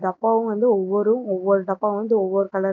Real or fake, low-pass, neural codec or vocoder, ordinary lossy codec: real; 7.2 kHz; none; MP3, 64 kbps